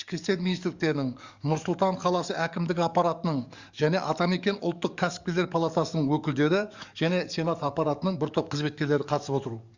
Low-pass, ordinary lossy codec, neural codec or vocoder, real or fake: 7.2 kHz; Opus, 64 kbps; codec, 44.1 kHz, 7.8 kbps, DAC; fake